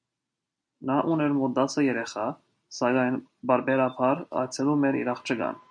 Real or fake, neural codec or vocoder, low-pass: real; none; 9.9 kHz